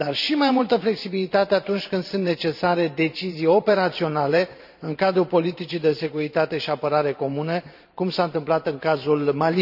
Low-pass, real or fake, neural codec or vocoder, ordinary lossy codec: 5.4 kHz; fake; vocoder, 44.1 kHz, 128 mel bands every 512 samples, BigVGAN v2; none